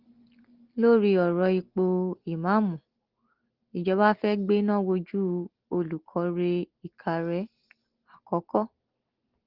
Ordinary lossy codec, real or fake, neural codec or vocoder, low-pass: Opus, 16 kbps; real; none; 5.4 kHz